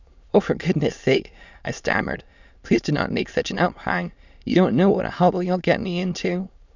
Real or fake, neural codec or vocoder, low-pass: fake; autoencoder, 22.05 kHz, a latent of 192 numbers a frame, VITS, trained on many speakers; 7.2 kHz